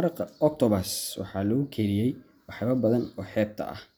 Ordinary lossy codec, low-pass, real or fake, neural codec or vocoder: none; none; real; none